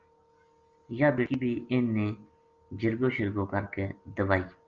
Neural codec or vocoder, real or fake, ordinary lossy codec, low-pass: none; real; Opus, 32 kbps; 7.2 kHz